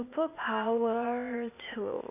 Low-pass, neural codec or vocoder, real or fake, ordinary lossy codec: 3.6 kHz; codec, 16 kHz, 0.8 kbps, ZipCodec; fake; none